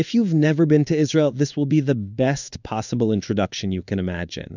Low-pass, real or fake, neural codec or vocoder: 7.2 kHz; fake; codec, 16 kHz in and 24 kHz out, 1 kbps, XY-Tokenizer